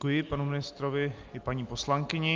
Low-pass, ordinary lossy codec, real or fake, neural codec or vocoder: 7.2 kHz; Opus, 32 kbps; real; none